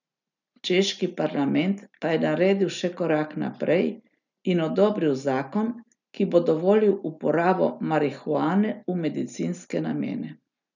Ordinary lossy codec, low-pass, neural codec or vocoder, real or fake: none; 7.2 kHz; none; real